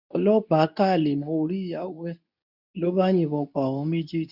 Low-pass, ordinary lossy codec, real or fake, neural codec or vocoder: 5.4 kHz; none; fake; codec, 24 kHz, 0.9 kbps, WavTokenizer, medium speech release version 2